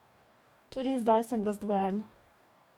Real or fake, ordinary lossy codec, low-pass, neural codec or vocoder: fake; none; 19.8 kHz; codec, 44.1 kHz, 2.6 kbps, DAC